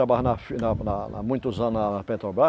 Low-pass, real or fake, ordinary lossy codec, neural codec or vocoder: none; real; none; none